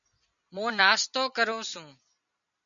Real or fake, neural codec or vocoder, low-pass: real; none; 7.2 kHz